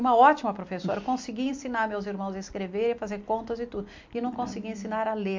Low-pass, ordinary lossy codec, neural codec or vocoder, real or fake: 7.2 kHz; MP3, 48 kbps; none; real